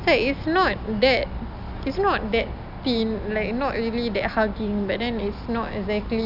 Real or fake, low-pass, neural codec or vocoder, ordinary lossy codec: real; 5.4 kHz; none; none